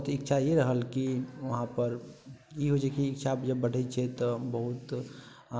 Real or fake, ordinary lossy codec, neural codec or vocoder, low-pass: real; none; none; none